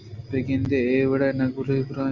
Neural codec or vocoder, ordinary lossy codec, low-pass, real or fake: none; AAC, 48 kbps; 7.2 kHz; real